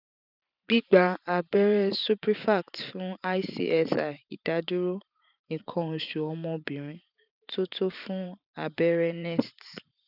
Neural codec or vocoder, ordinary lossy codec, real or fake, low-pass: vocoder, 44.1 kHz, 128 mel bands every 512 samples, BigVGAN v2; none; fake; 5.4 kHz